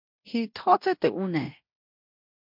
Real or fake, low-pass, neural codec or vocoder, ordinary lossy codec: fake; 5.4 kHz; codec, 16 kHz in and 24 kHz out, 0.9 kbps, LongCat-Audio-Codec, fine tuned four codebook decoder; MP3, 48 kbps